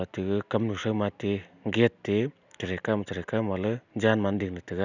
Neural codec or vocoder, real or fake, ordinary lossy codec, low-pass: none; real; none; 7.2 kHz